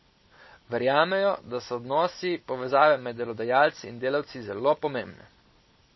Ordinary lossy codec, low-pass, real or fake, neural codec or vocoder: MP3, 24 kbps; 7.2 kHz; real; none